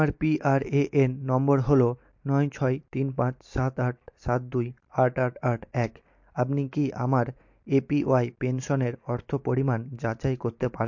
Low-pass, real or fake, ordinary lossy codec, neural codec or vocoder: 7.2 kHz; real; MP3, 48 kbps; none